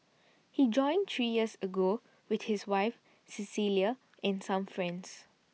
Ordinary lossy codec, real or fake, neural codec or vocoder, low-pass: none; real; none; none